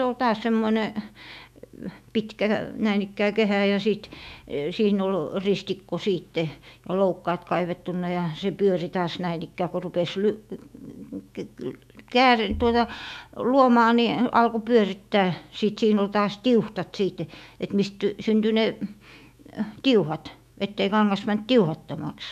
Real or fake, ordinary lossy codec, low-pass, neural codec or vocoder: fake; none; 14.4 kHz; autoencoder, 48 kHz, 128 numbers a frame, DAC-VAE, trained on Japanese speech